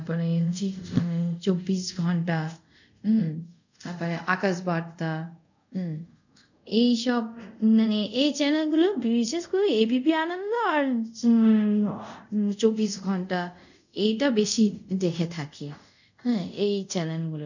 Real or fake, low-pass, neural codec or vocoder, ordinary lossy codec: fake; 7.2 kHz; codec, 24 kHz, 0.5 kbps, DualCodec; none